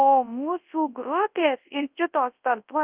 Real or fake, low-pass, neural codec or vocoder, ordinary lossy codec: fake; 3.6 kHz; codec, 24 kHz, 0.9 kbps, WavTokenizer, large speech release; Opus, 32 kbps